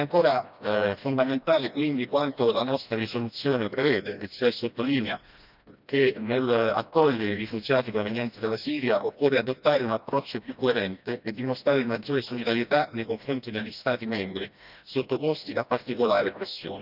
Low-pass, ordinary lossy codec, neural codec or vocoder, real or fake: 5.4 kHz; none; codec, 16 kHz, 1 kbps, FreqCodec, smaller model; fake